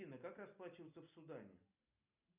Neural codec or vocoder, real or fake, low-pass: none; real; 3.6 kHz